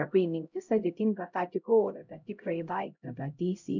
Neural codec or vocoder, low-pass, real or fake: codec, 16 kHz, 0.5 kbps, X-Codec, HuBERT features, trained on LibriSpeech; 7.2 kHz; fake